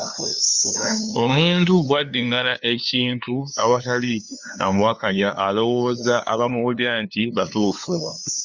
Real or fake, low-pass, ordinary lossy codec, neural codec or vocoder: fake; 7.2 kHz; Opus, 64 kbps; codec, 16 kHz, 2 kbps, FunCodec, trained on LibriTTS, 25 frames a second